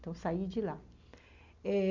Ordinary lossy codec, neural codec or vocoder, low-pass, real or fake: Opus, 64 kbps; none; 7.2 kHz; real